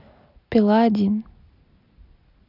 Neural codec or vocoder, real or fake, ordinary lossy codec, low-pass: none; real; none; 5.4 kHz